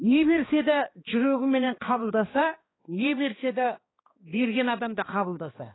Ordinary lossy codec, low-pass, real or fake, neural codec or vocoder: AAC, 16 kbps; 7.2 kHz; fake; codec, 16 kHz, 2 kbps, X-Codec, HuBERT features, trained on balanced general audio